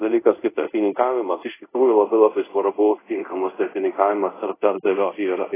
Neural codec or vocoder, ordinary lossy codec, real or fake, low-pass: codec, 24 kHz, 0.5 kbps, DualCodec; AAC, 16 kbps; fake; 3.6 kHz